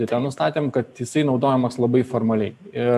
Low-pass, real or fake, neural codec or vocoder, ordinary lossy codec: 14.4 kHz; real; none; AAC, 96 kbps